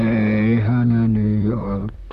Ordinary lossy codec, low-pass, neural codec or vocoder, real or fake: none; 14.4 kHz; vocoder, 44.1 kHz, 128 mel bands, Pupu-Vocoder; fake